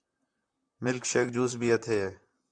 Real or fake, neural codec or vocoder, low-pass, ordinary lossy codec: fake; vocoder, 44.1 kHz, 128 mel bands, Pupu-Vocoder; 9.9 kHz; AAC, 64 kbps